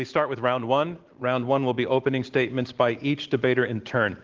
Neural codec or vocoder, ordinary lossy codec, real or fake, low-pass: none; Opus, 16 kbps; real; 7.2 kHz